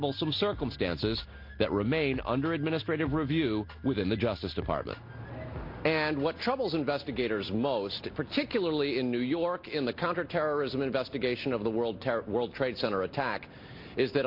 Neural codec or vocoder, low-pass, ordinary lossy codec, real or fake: none; 5.4 kHz; MP3, 32 kbps; real